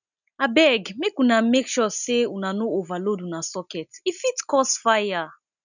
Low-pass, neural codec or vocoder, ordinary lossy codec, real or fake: 7.2 kHz; none; none; real